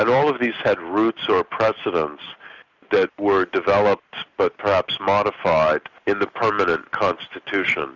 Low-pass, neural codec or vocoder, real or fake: 7.2 kHz; none; real